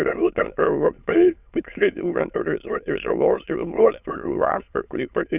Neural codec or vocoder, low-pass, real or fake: autoencoder, 22.05 kHz, a latent of 192 numbers a frame, VITS, trained on many speakers; 3.6 kHz; fake